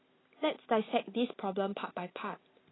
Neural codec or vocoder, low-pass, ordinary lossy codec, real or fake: none; 7.2 kHz; AAC, 16 kbps; real